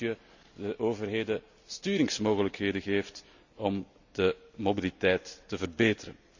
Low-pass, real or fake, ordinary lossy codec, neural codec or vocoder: 7.2 kHz; real; none; none